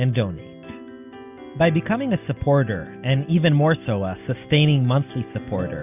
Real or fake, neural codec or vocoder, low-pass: real; none; 3.6 kHz